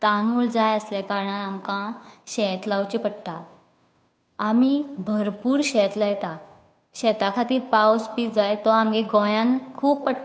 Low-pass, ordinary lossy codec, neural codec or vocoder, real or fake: none; none; codec, 16 kHz, 2 kbps, FunCodec, trained on Chinese and English, 25 frames a second; fake